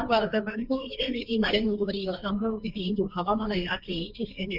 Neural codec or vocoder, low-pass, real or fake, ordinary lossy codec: codec, 16 kHz, 1.1 kbps, Voila-Tokenizer; 5.4 kHz; fake; none